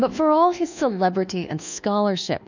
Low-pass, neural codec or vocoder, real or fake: 7.2 kHz; codec, 24 kHz, 1.2 kbps, DualCodec; fake